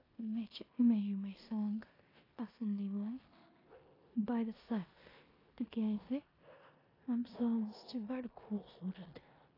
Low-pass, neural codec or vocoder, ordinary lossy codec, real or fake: 5.4 kHz; codec, 16 kHz in and 24 kHz out, 0.9 kbps, LongCat-Audio-Codec, four codebook decoder; AAC, 24 kbps; fake